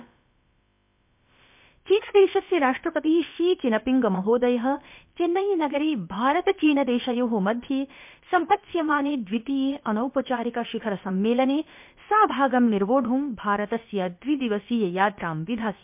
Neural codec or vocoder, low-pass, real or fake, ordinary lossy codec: codec, 16 kHz, about 1 kbps, DyCAST, with the encoder's durations; 3.6 kHz; fake; MP3, 32 kbps